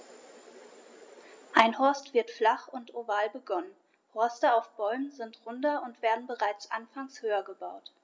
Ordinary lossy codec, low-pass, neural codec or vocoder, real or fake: none; none; none; real